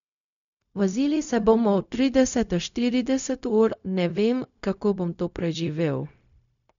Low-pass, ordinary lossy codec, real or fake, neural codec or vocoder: 7.2 kHz; none; fake; codec, 16 kHz, 0.4 kbps, LongCat-Audio-Codec